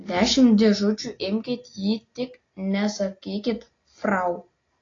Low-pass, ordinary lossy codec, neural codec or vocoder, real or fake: 7.2 kHz; AAC, 32 kbps; none; real